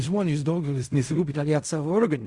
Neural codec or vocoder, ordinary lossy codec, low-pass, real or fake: codec, 16 kHz in and 24 kHz out, 0.4 kbps, LongCat-Audio-Codec, fine tuned four codebook decoder; Opus, 64 kbps; 10.8 kHz; fake